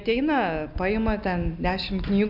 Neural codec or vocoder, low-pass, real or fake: none; 5.4 kHz; real